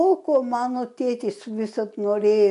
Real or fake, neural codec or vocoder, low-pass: real; none; 10.8 kHz